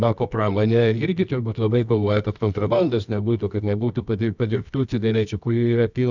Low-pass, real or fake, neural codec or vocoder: 7.2 kHz; fake; codec, 24 kHz, 0.9 kbps, WavTokenizer, medium music audio release